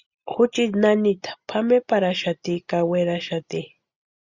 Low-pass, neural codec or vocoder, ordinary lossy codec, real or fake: 7.2 kHz; none; Opus, 64 kbps; real